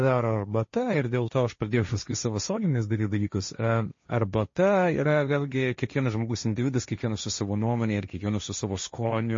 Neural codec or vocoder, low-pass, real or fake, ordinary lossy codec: codec, 16 kHz, 1.1 kbps, Voila-Tokenizer; 7.2 kHz; fake; MP3, 32 kbps